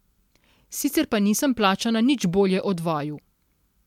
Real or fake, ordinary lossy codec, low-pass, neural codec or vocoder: real; MP3, 96 kbps; 19.8 kHz; none